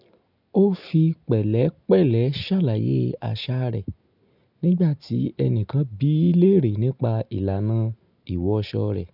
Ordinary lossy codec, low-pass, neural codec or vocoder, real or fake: none; 5.4 kHz; none; real